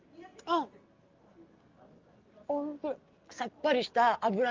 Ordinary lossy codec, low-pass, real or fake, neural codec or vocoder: Opus, 32 kbps; 7.2 kHz; real; none